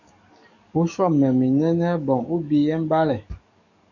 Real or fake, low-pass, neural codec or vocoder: fake; 7.2 kHz; codec, 44.1 kHz, 7.8 kbps, DAC